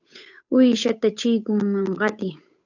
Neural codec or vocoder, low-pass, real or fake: codec, 16 kHz, 8 kbps, FunCodec, trained on Chinese and English, 25 frames a second; 7.2 kHz; fake